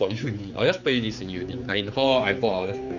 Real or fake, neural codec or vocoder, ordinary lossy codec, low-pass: fake; codec, 16 kHz, 4 kbps, X-Codec, HuBERT features, trained on general audio; none; 7.2 kHz